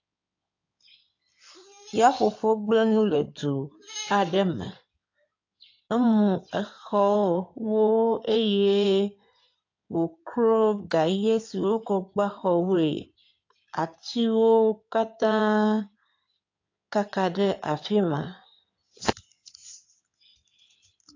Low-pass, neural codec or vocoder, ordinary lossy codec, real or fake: 7.2 kHz; codec, 16 kHz in and 24 kHz out, 2.2 kbps, FireRedTTS-2 codec; AAC, 48 kbps; fake